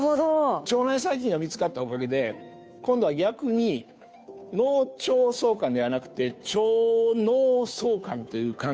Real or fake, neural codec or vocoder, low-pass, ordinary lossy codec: fake; codec, 16 kHz, 2 kbps, FunCodec, trained on Chinese and English, 25 frames a second; none; none